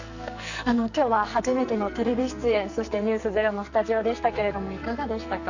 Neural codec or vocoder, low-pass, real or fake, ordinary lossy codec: codec, 44.1 kHz, 2.6 kbps, SNAC; 7.2 kHz; fake; none